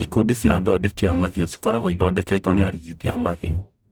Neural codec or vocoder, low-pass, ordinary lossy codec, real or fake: codec, 44.1 kHz, 0.9 kbps, DAC; none; none; fake